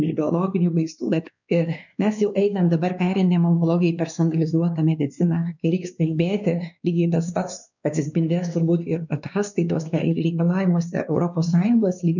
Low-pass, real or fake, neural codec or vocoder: 7.2 kHz; fake; codec, 16 kHz, 2 kbps, X-Codec, WavLM features, trained on Multilingual LibriSpeech